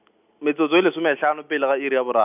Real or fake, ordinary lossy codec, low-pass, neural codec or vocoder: real; none; 3.6 kHz; none